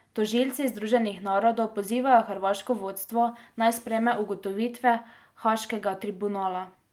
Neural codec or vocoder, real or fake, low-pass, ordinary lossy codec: none; real; 19.8 kHz; Opus, 32 kbps